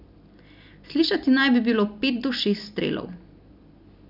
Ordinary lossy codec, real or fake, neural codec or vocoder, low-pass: none; real; none; 5.4 kHz